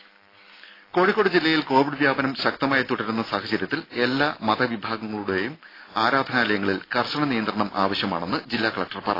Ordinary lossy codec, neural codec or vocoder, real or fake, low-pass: AAC, 24 kbps; none; real; 5.4 kHz